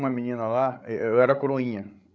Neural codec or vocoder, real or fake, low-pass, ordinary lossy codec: codec, 16 kHz, 16 kbps, FreqCodec, larger model; fake; none; none